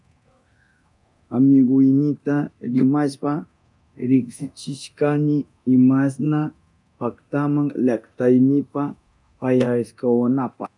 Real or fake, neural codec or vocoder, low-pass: fake; codec, 24 kHz, 0.9 kbps, DualCodec; 10.8 kHz